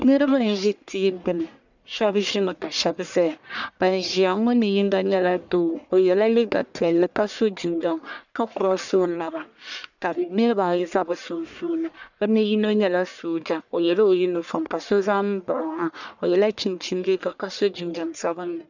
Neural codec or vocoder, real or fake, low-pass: codec, 44.1 kHz, 1.7 kbps, Pupu-Codec; fake; 7.2 kHz